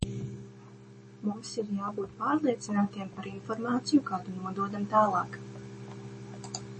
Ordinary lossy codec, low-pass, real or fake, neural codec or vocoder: MP3, 32 kbps; 10.8 kHz; real; none